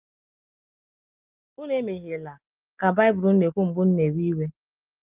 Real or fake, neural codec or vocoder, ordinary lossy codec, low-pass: real; none; Opus, 32 kbps; 3.6 kHz